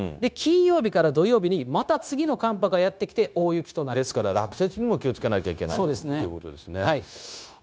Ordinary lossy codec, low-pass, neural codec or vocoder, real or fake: none; none; codec, 16 kHz, 0.9 kbps, LongCat-Audio-Codec; fake